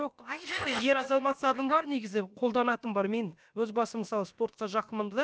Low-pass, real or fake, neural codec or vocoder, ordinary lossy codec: none; fake; codec, 16 kHz, about 1 kbps, DyCAST, with the encoder's durations; none